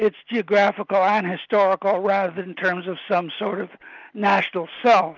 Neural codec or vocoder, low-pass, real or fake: none; 7.2 kHz; real